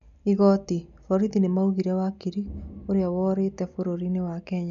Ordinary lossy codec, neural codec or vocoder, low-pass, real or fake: none; none; 7.2 kHz; real